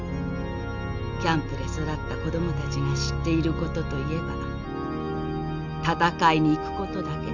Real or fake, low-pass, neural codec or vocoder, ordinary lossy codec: real; 7.2 kHz; none; none